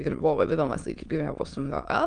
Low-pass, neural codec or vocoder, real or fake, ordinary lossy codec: 9.9 kHz; autoencoder, 22.05 kHz, a latent of 192 numbers a frame, VITS, trained on many speakers; fake; Opus, 32 kbps